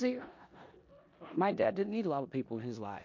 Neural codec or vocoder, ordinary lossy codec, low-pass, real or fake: codec, 16 kHz in and 24 kHz out, 0.9 kbps, LongCat-Audio-Codec, four codebook decoder; MP3, 48 kbps; 7.2 kHz; fake